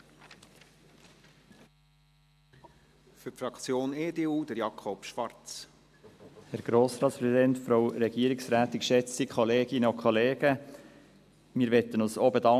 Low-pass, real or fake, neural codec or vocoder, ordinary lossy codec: 14.4 kHz; real; none; AAC, 96 kbps